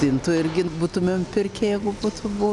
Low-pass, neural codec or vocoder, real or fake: 10.8 kHz; none; real